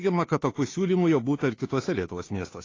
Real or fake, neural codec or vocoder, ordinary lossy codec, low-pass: fake; codec, 16 kHz, 2 kbps, FunCodec, trained on Chinese and English, 25 frames a second; AAC, 32 kbps; 7.2 kHz